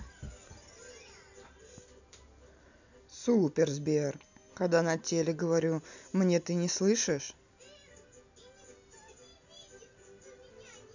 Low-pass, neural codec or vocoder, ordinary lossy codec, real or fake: 7.2 kHz; none; none; real